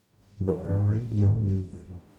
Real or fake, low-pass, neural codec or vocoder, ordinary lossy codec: fake; 19.8 kHz; codec, 44.1 kHz, 0.9 kbps, DAC; none